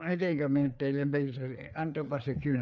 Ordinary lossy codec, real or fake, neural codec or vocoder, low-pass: none; fake; codec, 16 kHz, 4 kbps, FreqCodec, larger model; none